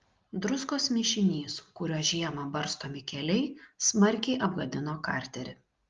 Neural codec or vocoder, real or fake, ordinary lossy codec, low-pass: none; real; Opus, 32 kbps; 7.2 kHz